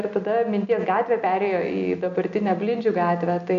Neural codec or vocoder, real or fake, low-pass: none; real; 7.2 kHz